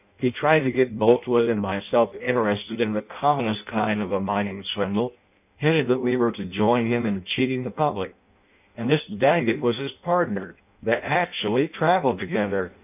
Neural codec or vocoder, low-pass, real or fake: codec, 16 kHz in and 24 kHz out, 0.6 kbps, FireRedTTS-2 codec; 3.6 kHz; fake